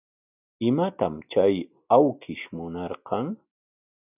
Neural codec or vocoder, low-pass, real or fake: none; 3.6 kHz; real